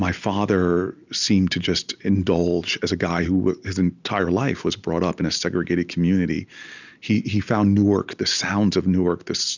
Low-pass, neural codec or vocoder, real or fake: 7.2 kHz; none; real